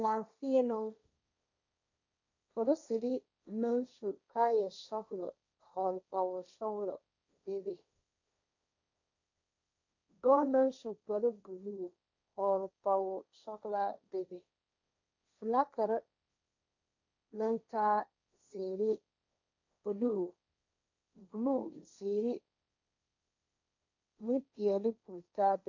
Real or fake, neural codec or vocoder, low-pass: fake; codec, 16 kHz, 1.1 kbps, Voila-Tokenizer; 7.2 kHz